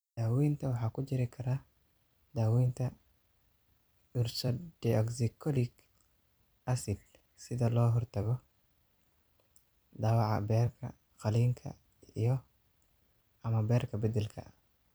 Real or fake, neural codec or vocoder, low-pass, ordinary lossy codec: fake; vocoder, 44.1 kHz, 128 mel bands every 256 samples, BigVGAN v2; none; none